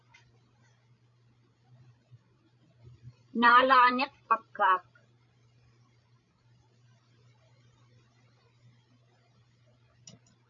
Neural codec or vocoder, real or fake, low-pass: codec, 16 kHz, 16 kbps, FreqCodec, larger model; fake; 7.2 kHz